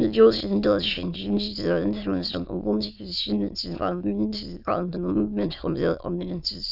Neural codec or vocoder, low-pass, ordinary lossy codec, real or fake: autoencoder, 22.05 kHz, a latent of 192 numbers a frame, VITS, trained on many speakers; 5.4 kHz; none; fake